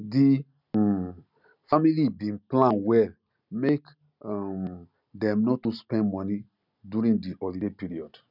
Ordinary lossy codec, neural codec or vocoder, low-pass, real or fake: none; none; 5.4 kHz; real